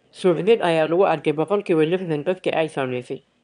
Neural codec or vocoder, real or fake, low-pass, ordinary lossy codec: autoencoder, 22.05 kHz, a latent of 192 numbers a frame, VITS, trained on one speaker; fake; 9.9 kHz; none